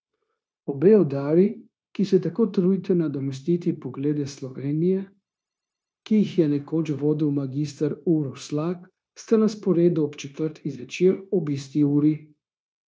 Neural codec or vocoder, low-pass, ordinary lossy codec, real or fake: codec, 16 kHz, 0.9 kbps, LongCat-Audio-Codec; none; none; fake